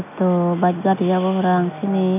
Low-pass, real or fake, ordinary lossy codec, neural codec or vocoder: 3.6 kHz; real; none; none